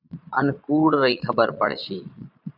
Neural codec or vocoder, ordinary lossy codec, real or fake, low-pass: vocoder, 22.05 kHz, 80 mel bands, Vocos; AAC, 48 kbps; fake; 5.4 kHz